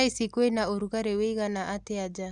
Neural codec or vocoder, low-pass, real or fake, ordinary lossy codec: none; 10.8 kHz; real; Opus, 64 kbps